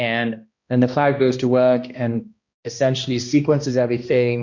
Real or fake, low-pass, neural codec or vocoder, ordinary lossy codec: fake; 7.2 kHz; codec, 16 kHz, 1 kbps, X-Codec, HuBERT features, trained on balanced general audio; MP3, 48 kbps